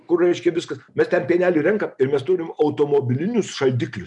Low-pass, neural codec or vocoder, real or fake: 10.8 kHz; none; real